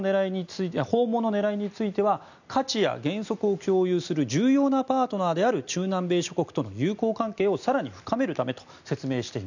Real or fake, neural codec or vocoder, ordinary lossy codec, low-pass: real; none; none; 7.2 kHz